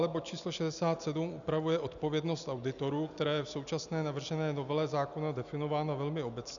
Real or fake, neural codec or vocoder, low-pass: real; none; 7.2 kHz